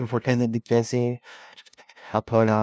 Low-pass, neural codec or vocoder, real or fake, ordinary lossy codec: none; codec, 16 kHz, 0.5 kbps, FunCodec, trained on LibriTTS, 25 frames a second; fake; none